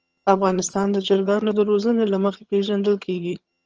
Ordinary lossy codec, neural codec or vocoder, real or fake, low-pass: Opus, 24 kbps; vocoder, 22.05 kHz, 80 mel bands, HiFi-GAN; fake; 7.2 kHz